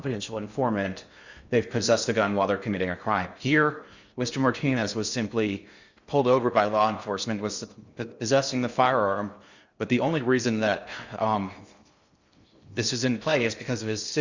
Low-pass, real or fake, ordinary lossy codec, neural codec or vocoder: 7.2 kHz; fake; Opus, 64 kbps; codec, 16 kHz in and 24 kHz out, 0.6 kbps, FocalCodec, streaming, 2048 codes